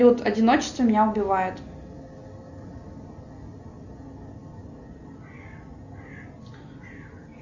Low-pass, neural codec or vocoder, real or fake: 7.2 kHz; none; real